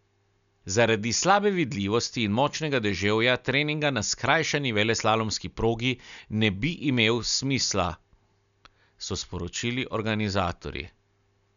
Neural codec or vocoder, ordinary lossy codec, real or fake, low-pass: none; none; real; 7.2 kHz